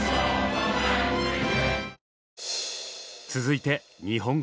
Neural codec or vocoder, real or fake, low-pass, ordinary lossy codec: none; real; none; none